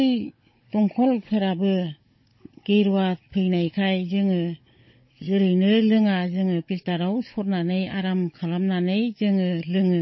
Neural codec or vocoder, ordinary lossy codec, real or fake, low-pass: codec, 16 kHz, 16 kbps, FunCodec, trained on Chinese and English, 50 frames a second; MP3, 24 kbps; fake; 7.2 kHz